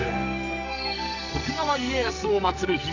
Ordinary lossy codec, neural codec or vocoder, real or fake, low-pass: none; codec, 44.1 kHz, 2.6 kbps, SNAC; fake; 7.2 kHz